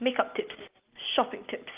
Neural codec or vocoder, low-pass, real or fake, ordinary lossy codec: none; 3.6 kHz; real; Opus, 32 kbps